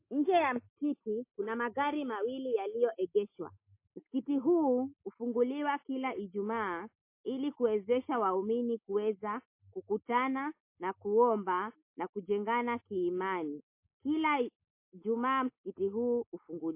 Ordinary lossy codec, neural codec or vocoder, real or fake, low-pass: MP3, 24 kbps; none; real; 3.6 kHz